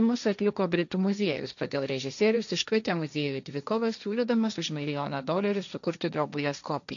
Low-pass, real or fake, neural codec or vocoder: 7.2 kHz; fake; codec, 16 kHz, 1.1 kbps, Voila-Tokenizer